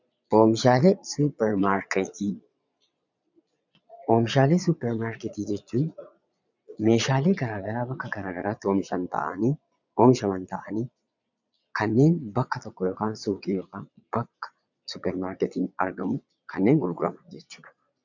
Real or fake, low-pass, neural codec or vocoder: fake; 7.2 kHz; vocoder, 22.05 kHz, 80 mel bands, Vocos